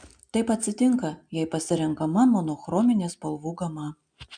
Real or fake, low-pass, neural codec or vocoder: real; 9.9 kHz; none